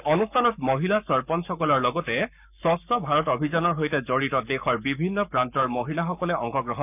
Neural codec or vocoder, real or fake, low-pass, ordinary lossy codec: codec, 44.1 kHz, 7.8 kbps, Pupu-Codec; fake; 3.6 kHz; none